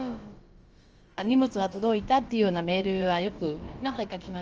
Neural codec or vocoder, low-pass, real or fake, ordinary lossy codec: codec, 16 kHz, about 1 kbps, DyCAST, with the encoder's durations; 7.2 kHz; fake; Opus, 24 kbps